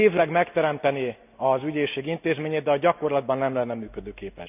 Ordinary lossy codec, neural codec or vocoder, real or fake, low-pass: none; none; real; 3.6 kHz